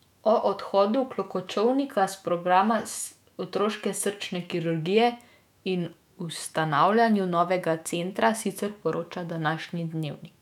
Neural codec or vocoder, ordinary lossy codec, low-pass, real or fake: autoencoder, 48 kHz, 128 numbers a frame, DAC-VAE, trained on Japanese speech; none; 19.8 kHz; fake